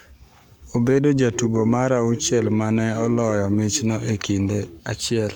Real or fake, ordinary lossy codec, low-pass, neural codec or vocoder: fake; none; 19.8 kHz; codec, 44.1 kHz, 7.8 kbps, Pupu-Codec